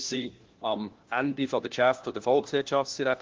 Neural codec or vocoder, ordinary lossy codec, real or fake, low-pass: codec, 16 kHz, 1 kbps, FunCodec, trained on LibriTTS, 50 frames a second; Opus, 16 kbps; fake; 7.2 kHz